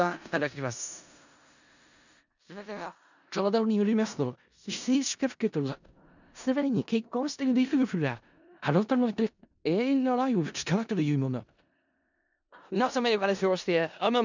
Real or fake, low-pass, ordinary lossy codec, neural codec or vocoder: fake; 7.2 kHz; none; codec, 16 kHz in and 24 kHz out, 0.4 kbps, LongCat-Audio-Codec, four codebook decoder